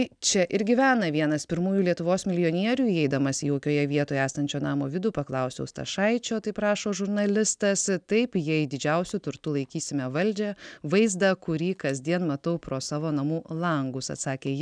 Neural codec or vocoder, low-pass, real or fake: none; 9.9 kHz; real